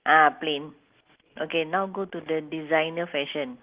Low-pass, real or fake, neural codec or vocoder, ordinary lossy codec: 3.6 kHz; real; none; Opus, 16 kbps